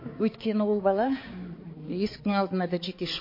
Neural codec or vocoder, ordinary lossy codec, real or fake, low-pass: codec, 16 kHz, 4 kbps, X-Codec, HuBERT features, trained on balanced general audio; MP3, 24 kbps; fake; 5.4 kHz